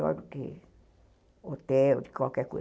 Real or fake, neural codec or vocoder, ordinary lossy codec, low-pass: real; none; none; none